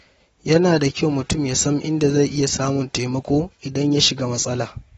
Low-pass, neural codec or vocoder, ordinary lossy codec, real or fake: 19.8 kHz; none; AAC, 24 kbps; real